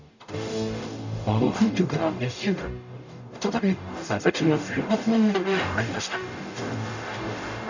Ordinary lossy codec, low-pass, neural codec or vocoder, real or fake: none; 7.2 kHz; codec, 44.1 kHz, 0.9 kbps, DAC; fake